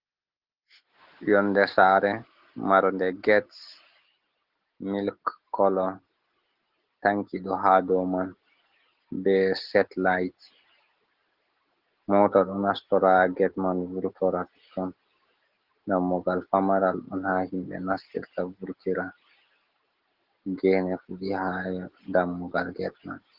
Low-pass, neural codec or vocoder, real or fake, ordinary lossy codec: 5.4 kHz; none; real; Opus, 16 kbps